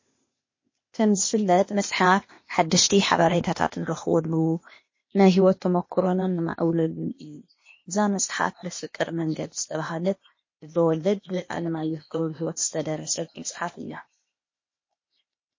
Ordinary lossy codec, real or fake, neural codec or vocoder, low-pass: MP3, 32 kbps; fake; codec, 16 kHz, 0.8 kbps, ZipCodec; 7.2 kHz